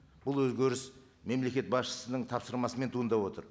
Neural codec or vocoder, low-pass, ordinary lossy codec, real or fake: none; none; none; real